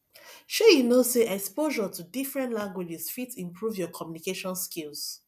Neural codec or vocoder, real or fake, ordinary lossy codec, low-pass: none; real; none; 14.4 kHz